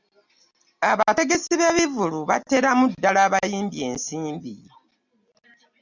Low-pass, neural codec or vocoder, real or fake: 7.2 kHz; none; real